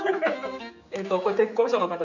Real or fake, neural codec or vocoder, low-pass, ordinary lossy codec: fake; codec, 16 kHz, 4 kbps, X-Codec, HuBERT features, trained on general audio; 7.2 kHz; none